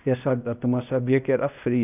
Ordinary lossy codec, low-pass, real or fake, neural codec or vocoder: none; 3.6 kHz; fake; codec, 16 kHz, 0.8 kbps, ZipCodec